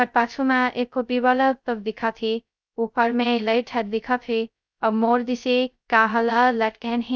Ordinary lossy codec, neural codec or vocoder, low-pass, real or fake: none; codec, 16 kHz, 0.2 kbps, FocalCodec; none; fake